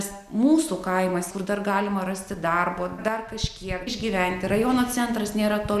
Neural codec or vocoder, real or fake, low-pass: none; real; 14.4 kHz